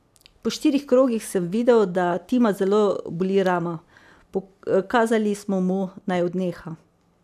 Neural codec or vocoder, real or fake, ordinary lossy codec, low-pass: none; real; none; 14.4 kHz